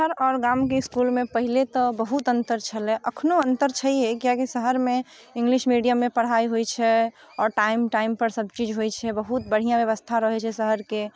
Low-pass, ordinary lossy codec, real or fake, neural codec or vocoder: none; none; real; none